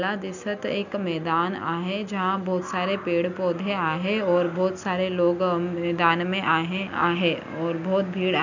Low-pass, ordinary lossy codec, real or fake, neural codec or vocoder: 7.2 kHz; none; real; none